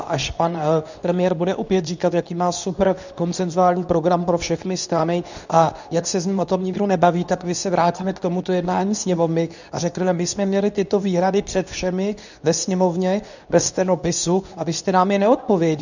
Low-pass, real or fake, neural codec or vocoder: 7.2 kHz; fake; codec, 24 kHz, 0.9 kbps, WavTokenizer, medium speech release version 2